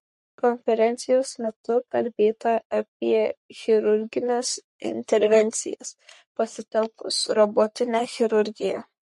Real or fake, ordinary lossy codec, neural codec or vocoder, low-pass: fake; MP3, 48 kbps; codec, 44.1 kHz, 3.4 kbps, Pupu-Codec; 14.4 kHz